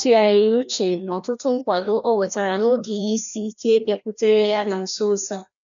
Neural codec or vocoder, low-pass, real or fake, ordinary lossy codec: codec, 16 kHz, 1 kbps, FreqCodec, larger model; 7.2 kHz; fake; none